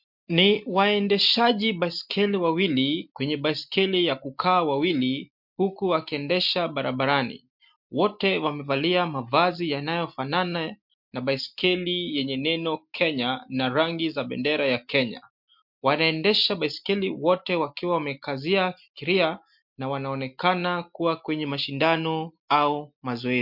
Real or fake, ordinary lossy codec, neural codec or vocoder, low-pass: real; MP3, 48 kbps; none; 5.4 kHz